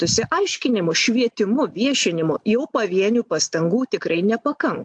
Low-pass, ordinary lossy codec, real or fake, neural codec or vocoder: 9.9 kHz; AAC, 64 kbps; real; none